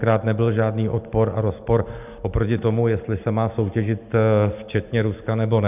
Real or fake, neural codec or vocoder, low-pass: real; none; 3.6 kHz